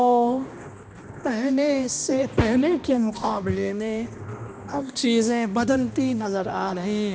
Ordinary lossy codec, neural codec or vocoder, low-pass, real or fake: none; codec, 16 kHz, 1 kbps, X-Codec, HuBERT features, trained on balanced general audio; none; fake